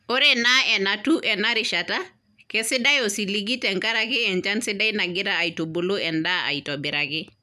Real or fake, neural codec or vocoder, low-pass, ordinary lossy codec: real; none; 14.4 kHz; none